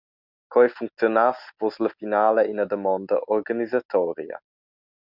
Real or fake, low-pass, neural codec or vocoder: real; 5.4 kHz; none